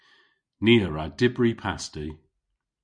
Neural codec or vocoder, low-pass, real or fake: none; 9.9 kHz; real